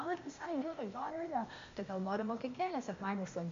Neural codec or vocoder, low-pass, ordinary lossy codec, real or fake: codec, 16 kHz, 0.8 kbps, ZipCodec; 7.2 kHz; MP3, 48 kbps; fake